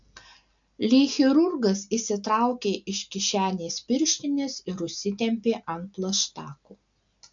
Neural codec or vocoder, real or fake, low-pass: none; real; 7.2 kHz